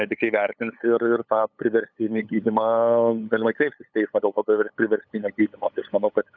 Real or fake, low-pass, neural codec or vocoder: fake; 7.2 kHz; codec, 16 kHz, 8 kbps, FunCodec, trained on LibriTTS, 25 frames a second